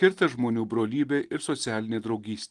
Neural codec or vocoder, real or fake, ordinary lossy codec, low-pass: none; real; Opus, 24 kbps; 10.8 kHz